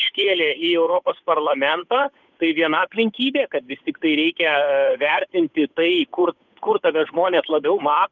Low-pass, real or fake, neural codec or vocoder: 7.2 kHz; fake; codec, 16 kHz, 8 kbps, FunCodec, trained on Chinese and English, 25 frames a second